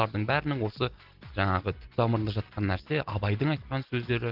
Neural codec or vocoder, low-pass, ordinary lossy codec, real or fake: none; 5.4 kHz; Opus, 16 kbps; real